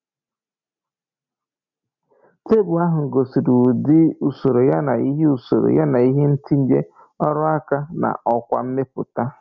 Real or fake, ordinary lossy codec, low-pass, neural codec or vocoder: real; none; 7.2 kHz; none